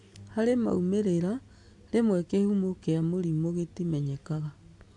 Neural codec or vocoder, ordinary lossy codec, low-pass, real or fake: none; AAC, 64 kbps; 10.8 kHz; real